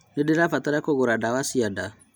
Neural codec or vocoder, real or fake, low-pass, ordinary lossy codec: none; real; none; none